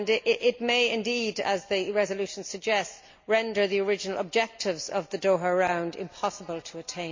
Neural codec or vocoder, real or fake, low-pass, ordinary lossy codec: none; real; 7.2 kHz; MP3, 48 kbps